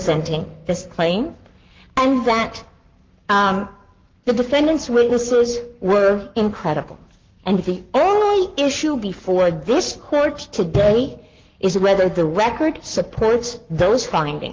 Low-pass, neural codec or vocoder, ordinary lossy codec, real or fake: 7.2 kHz; codec, 44.1 kHz, 7.8 kbps, Pupu-Codec; Opus, 32 kbps; fake